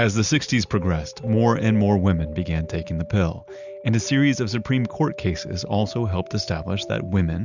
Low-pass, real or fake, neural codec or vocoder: 7.2 kHz; real; none